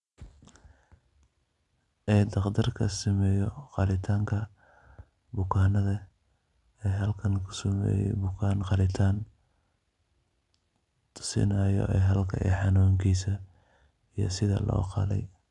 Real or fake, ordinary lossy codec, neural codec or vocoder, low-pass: real; none; none; 10.8 kHz